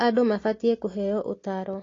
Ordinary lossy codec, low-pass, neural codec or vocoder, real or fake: AAC, 32 kbps; 7.2 kHz; none; real